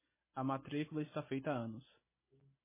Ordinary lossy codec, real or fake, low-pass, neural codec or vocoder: MP3, 16 kbps; real; 3.6 kHz; none